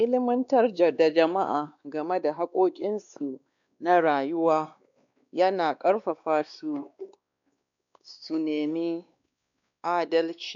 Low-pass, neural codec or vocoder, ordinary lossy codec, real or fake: 7.2 kHz; codec, 16 kHz, 2 kbps, X-Codec, WavLM features, trained on Multilingual LibriSpeech; none; fake